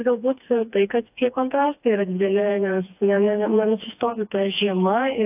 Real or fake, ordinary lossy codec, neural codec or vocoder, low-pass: fake; AAC, 32 kbps; codec, 16 kHz, 2 kbps, FreqCodec, smaller model; 3.6 kHz